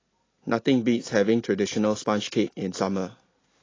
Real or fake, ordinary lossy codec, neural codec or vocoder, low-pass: real; AAC, 32 kbps; none; 7.2 kHz